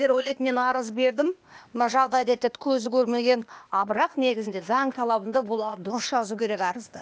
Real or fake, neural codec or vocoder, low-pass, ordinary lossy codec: fake; codec, 16 kHz, 0.8 kbps, ZipCodec; none; none